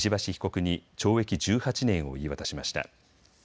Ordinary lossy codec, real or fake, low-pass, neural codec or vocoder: none; real; none; none